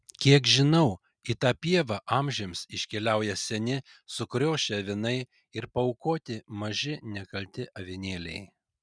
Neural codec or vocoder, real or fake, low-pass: none; real; 9.9 kHz